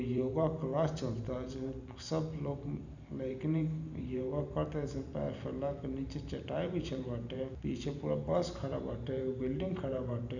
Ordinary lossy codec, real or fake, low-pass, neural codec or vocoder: none; fake; 7.2 kHz; vocoder, 44.1 kHz, 128 mel bands every 512 samples, BigVGAN v2